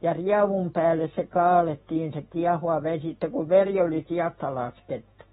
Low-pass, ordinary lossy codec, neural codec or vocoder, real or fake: 19.8 kHz; AAC, 16 kbps; autoencoder, 48 kHz, 128 numbers a frame, DAC-VAE, trained on Japanese speech; fake